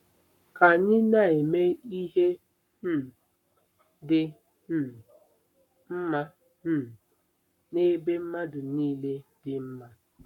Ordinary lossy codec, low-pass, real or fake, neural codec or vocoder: none; 19.8 kHz; fake; codec, 44.1 kHz, 7.8 kbps, Pupu-Codec